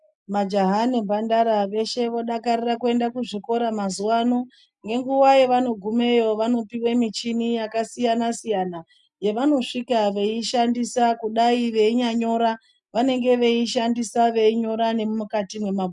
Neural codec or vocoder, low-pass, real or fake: none; 10.8 kHz; real